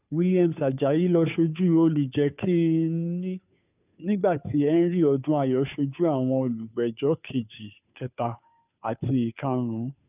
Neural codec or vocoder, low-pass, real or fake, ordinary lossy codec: codec, 16 kHz, 2 kbps, FunCodec, trained on Chinese and English, 25 frames a second; 3.6 kHz; fake; none